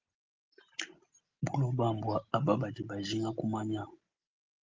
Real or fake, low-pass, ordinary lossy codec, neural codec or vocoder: real; 7.2 kHz; Opus, 32 kbps; none